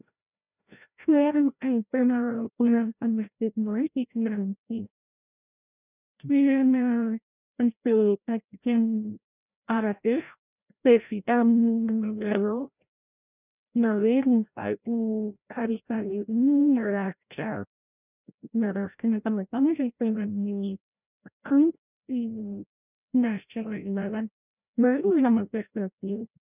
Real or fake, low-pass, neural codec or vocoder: fake; 3.6 kHz; codec, 16 kHz, 0.5 kbps, FreqCodec, larger model